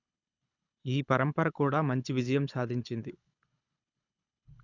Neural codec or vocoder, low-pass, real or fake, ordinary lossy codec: codec, 24 kHz, 6 kbps, HILCodec; 7.2 kHz; fake; none